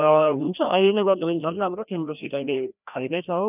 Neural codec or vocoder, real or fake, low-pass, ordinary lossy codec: codec, 16 kHz, 1 kbps, FreqCodec, larger model; fake; 3.6 kHz; none